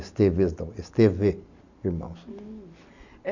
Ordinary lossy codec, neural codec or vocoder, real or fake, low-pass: none; none; real; 7.2 kHz